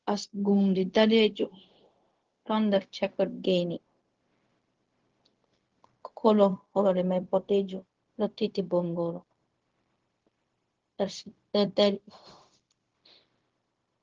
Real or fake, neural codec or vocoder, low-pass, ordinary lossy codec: fake; codec, 16 kHz, 0.4 kbps, LongCat-Audio-Codec; 7.2 kHz; Opus, 16 kbps